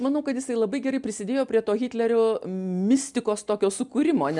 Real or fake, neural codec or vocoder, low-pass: real; none; 10.8 kHz